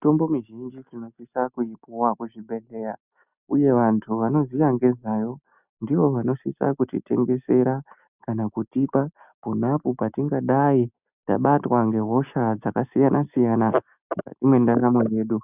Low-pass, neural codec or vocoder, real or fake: 3.6 kHz; none; real